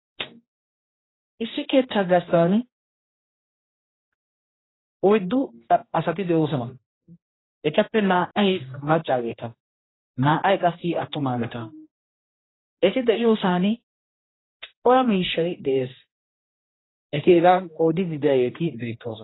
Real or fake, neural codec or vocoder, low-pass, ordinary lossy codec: fake; codec, 16 kHz, 1 kbps, X-Codec, HuBERT features, trained on general audio; 7.2 kHz; AAC, 16 kbps